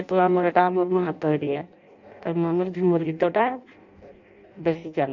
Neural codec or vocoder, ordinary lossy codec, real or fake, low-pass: codec, 16 kHz in and 24 kHz out, 0.6 kbps, FireRedTTS-2 codec; none; fake; 7.2 kHz